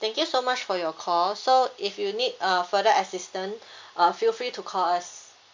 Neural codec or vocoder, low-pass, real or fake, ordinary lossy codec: none; 7.2 kHz; real; MP3, 48 kbps